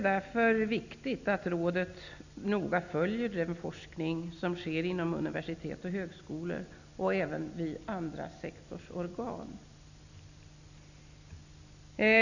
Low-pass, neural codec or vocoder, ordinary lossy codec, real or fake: 7.2 kHz; none; none; real